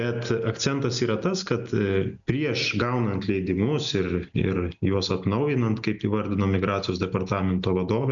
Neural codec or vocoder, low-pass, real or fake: none; 7.2 kHz; real